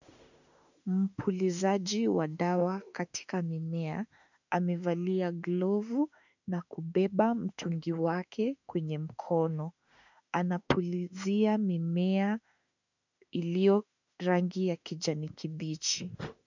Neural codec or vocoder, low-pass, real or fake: autoencoder, 48 kHz, 32 numbers a frame, DAC-VAE, trained on Japanese speech; 7.2 kHz; fake